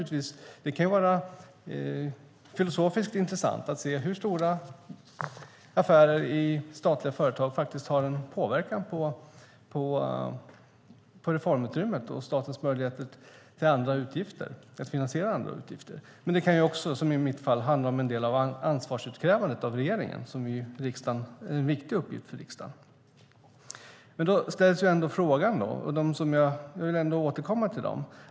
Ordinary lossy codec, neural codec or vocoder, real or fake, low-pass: none; none; real; none